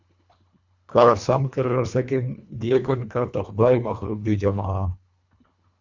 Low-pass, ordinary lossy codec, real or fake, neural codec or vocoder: 7.2 kHz; Opus, 64 kbps; fake; codec, 24 kHz, 1.5 kbps, HILCodec